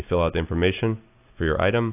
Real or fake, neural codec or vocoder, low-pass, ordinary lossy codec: real; none; 3.6 kHz; AAC, 32 kbps